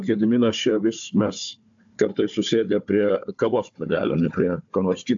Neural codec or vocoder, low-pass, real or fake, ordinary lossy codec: codec, 16 kHz, 4 kbps, FunCodec, trained on Chinese and English, 50 frames a second; 7.2 kHz; fake; AAC, 64 kbps